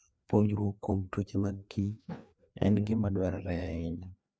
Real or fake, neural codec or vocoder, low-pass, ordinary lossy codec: fake; codec, 16 kHz, 2 kbps, FreqCodec, larger model; none; none